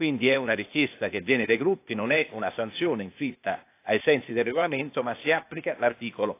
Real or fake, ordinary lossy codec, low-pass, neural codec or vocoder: fake; AAC, 24 kbps; 3.6 kHz; codec, 16 kHz, 0.8 kbps, ZipCodec